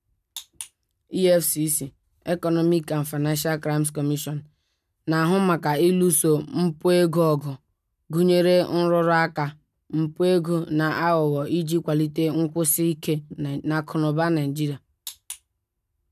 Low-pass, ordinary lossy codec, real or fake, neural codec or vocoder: 14.4 kHz; none; real; none